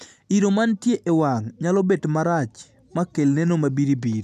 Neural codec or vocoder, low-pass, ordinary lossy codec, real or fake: none; 14.4 kHz; none; real